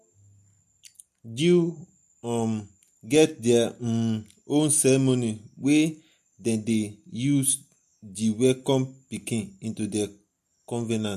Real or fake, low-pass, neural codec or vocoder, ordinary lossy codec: real; 14.4 kHz; none; MP3, 64 kbps